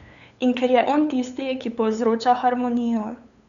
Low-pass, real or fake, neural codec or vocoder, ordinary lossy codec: 7.2 kHz; fake; codec, 16 kHz, 8 kbps, FunCodec, trained on LibriTTS, 25 frames a second; none